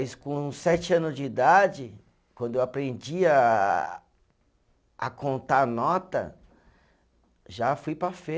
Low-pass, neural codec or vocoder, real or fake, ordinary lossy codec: none; none; real; none